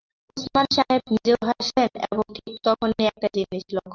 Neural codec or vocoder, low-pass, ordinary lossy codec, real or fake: none; 7.2 kHz; Opus, 24 kbps; real